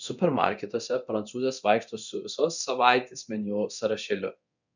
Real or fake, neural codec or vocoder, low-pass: fake; codec, 24 kHz, 0.9 kbps, DualCodec; 7.2 kHz